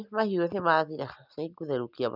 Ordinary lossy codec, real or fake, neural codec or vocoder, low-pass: none; real; none; 5.4 kHz